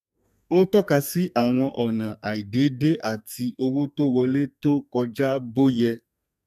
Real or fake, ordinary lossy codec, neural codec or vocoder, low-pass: fake; none; codec, 32 kHz, 1.9 kbps, SNAC; 14.4 kHz